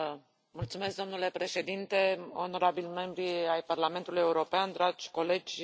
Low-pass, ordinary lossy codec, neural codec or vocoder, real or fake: none; none; none; real